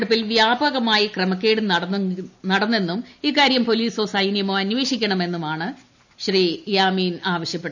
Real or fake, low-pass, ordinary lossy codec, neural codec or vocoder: real; 7.2 kHz; none; none